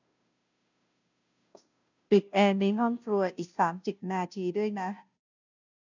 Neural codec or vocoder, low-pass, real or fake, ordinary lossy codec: codec, 16 kHz, 0.5 kbps, FunCodec, trained on Chinese and English, 25 frames a second; 7.2 kHz; fake; none